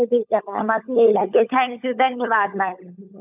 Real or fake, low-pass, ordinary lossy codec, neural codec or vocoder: fake; 3.6 kHz; none; codec, 16 kHz, 16 kbps, FunCodec, trained on LibriTTS, 50 frames a second